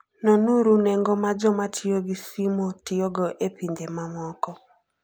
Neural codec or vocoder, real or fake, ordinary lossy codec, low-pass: none; real; none; none